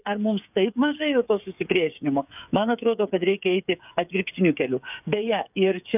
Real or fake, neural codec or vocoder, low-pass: fake; codec, 16 kHz, 8 kbps, FreqCodec, smaller model; 3.6 kHz